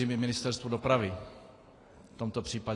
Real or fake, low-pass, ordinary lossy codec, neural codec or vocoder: real; 10.8 kHz; AAC, 32 kbps; none